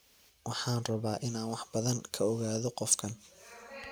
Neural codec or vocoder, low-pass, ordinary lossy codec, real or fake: none; none; none; real